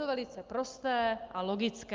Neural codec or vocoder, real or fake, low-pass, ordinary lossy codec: none; real; 7.2 kHz; Opus, 24 kbps